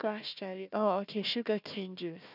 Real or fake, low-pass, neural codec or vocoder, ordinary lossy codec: fake; 5.4 kHz; codec, 16 kHz, 1 kbps, FunCodec, trained on Chinese and English, 50 frames a second; none